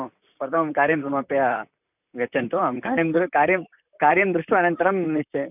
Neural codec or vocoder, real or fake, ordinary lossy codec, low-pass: vocoder, 44.1 kHz, 128 mel bands, Pupu-Vocoder; fake; none; 3.6 kHz